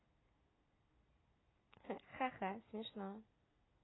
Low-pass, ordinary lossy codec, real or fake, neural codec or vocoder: 7.2 kHz; AAC, 16 kbps; real; none